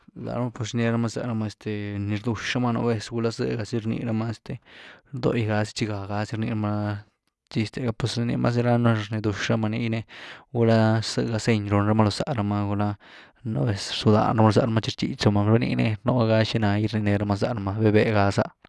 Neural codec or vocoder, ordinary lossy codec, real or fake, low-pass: none; none; real; none